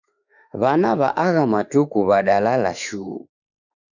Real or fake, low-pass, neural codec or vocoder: fake; 7.2 kHz; autoencoder, 48 kHz, 32 numbers a frame, DAC-VAE, trained on Japanese speech